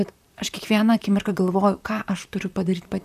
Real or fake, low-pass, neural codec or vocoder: fake; 14.4 kHz; vocoder, 44.1 kHz, 128 mel bands, Pupu-Vocoder